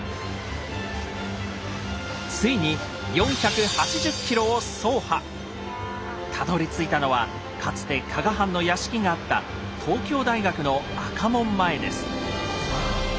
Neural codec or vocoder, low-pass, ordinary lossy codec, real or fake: none; none; none; real